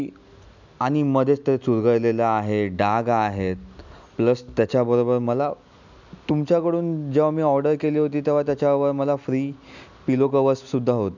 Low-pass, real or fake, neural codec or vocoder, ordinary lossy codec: 7.2 kHz; real; none; none